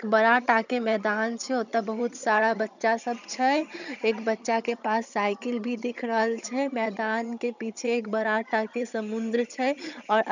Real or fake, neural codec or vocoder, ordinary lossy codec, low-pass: fake; vocoder, 22.05 kHz, 80 mel bands, HiFi-GAN; none; 7.2 kHz